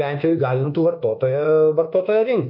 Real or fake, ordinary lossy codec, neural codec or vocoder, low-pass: fake; MP3, 32 kbps; codec, 24 kHz, 1.2 kbps, DualCodec; 5.4 kHz